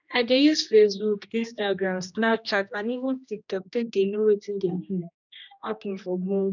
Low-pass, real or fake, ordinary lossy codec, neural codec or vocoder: 7.2 kHz; fake; none; codec, 16 kHz, 1 kbps, X-Codec, HuBERT features, trained on general audio